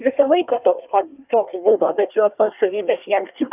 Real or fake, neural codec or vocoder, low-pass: fake; codec, 24 kHz, 1 kbps, SNAC; 3.6 kHz